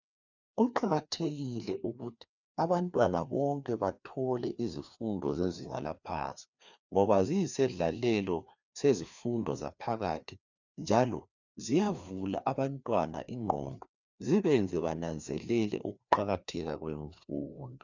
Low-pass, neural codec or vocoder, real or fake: 7.2 kHz; codec, 16 kHz, 2 kbps, FreqCodec, larger model; fake